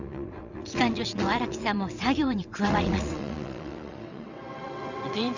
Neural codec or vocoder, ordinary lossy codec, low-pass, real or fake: vocoder, 22.05 kHz, 80 mel bands, WaveNeXt; none; 7.2 kHz; fake